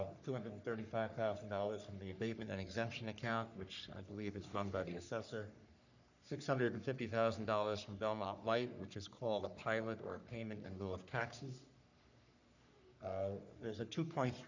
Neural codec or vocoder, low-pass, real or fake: codec, 44.1 kHz, 3.4 kbps, Pupu-Codec; 7.2 kHz; fake